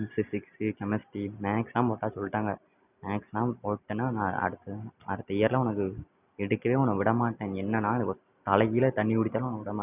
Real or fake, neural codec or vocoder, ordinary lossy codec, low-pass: real; none; none; 3.6 kHz